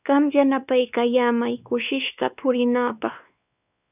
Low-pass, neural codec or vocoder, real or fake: 3.6 kHz; codec, 16 kHz, 2 kbps, X-Codec, WavLM features, trained on Multilingual LibriSpeech; fake